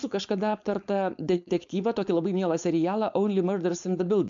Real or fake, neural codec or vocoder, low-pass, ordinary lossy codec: fake; codec, 16 kHz, 4.8 kbps, FACodec; 7.2 kHz; MP3, 64 kbps